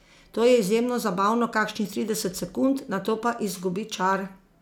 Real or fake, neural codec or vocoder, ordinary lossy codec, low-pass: real; none; none; 19.8 kHz